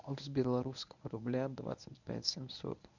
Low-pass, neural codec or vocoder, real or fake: 7.2 kHz; codec, 24 kHz, 0.9 kbps, WavTokenizer, medium speech release version 1; fake